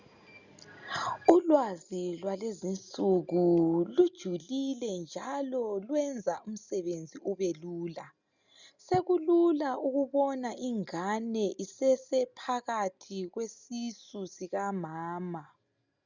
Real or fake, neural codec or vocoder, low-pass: real; none; 7.2 kHz